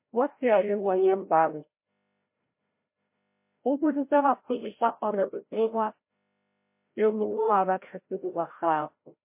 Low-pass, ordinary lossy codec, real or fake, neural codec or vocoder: 3.6 kHz; MP3, 24 kbps; fake; codec, 16 kHz, 0.5 kbps, FreqCodec, larger model